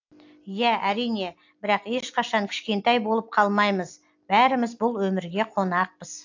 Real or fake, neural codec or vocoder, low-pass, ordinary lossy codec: real; none; 7.2 kHz; AAC, 48 kbps